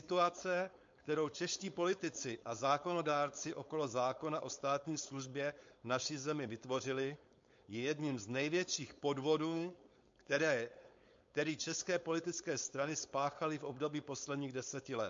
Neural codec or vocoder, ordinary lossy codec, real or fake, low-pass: codec, 16 kHz, 4.8 kbps, FACodec; MP3, 48 kbps; fake; 7.2 kHz